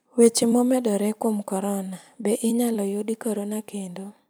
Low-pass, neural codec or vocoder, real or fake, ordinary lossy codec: none; vocoder, 44.1 kHz, 128 mel bands every 256 samples, BigVGAN v2; fake; none